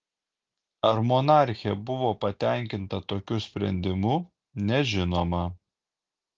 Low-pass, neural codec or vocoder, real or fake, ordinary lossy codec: 7.2 kHz; none; real; Opus, 32 kbps